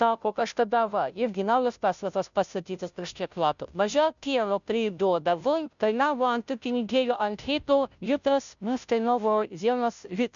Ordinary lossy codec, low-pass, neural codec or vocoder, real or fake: MP3, 96 kbps; 7.2 kHz; codec, 16 kHz, 0.5 kbps, FunCodec, trained on Chinese and English, 25 frames a second; fake